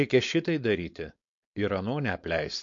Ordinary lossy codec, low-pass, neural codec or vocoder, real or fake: MP3, 48 kbps; 7.2 kHz; codec, 16 kHz, 4.8 kbps, FACodec; fake